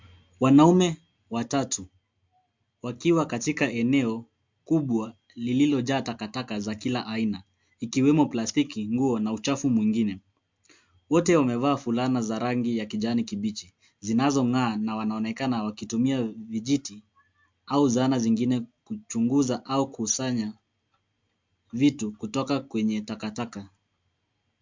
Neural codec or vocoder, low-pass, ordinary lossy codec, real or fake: none; 7.2 kHz; MP3, 64 kbps; real